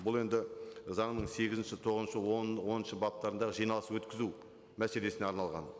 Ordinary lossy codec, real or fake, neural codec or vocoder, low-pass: none; real; none; none